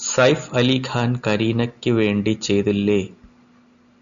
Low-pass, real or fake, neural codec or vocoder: 7.2 kHz; real; none